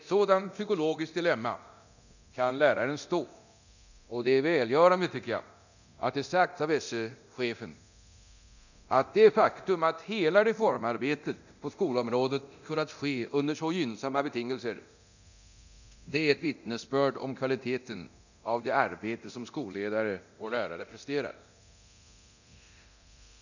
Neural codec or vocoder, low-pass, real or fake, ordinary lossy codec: codec, 24 kHz, 0.9 kbps, DualCodec; 7.2 kHz; fake; none